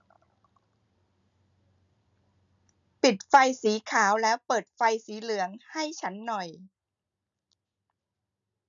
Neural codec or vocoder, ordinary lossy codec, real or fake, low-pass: none; none; real; 7.2 kHz